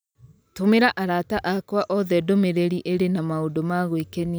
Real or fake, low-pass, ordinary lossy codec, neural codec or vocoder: real; none; none; none